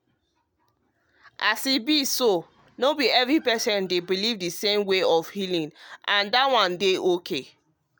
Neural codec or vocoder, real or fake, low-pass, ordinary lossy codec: none; real; none; none